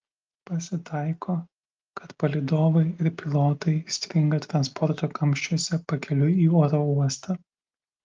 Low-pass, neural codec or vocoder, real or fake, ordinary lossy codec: 7.2 kHz; none; real; Opus, 24 kbps